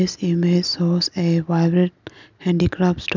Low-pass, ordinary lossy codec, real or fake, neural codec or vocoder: 7.2 kHz; none; real; none